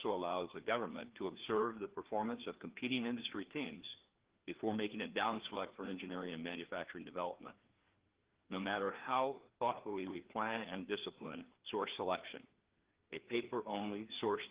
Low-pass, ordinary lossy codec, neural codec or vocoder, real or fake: 3.6 kHz; Opus, 16 kbps; codec, 16 kHz, 2 kbps, FreqCodec, larger model; fake